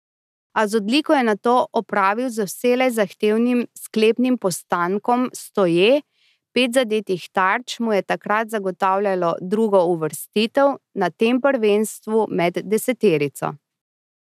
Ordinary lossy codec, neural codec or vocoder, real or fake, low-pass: none; none; real; 14.4 kHz